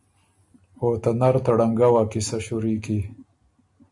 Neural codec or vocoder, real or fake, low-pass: none; real; 10.8 kHz